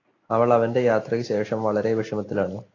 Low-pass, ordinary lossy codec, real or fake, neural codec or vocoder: 7.2 kHz; AAC, 32 kbps; real; none